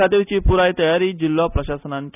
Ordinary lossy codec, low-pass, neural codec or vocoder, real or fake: none; 3.6 kHz; none; real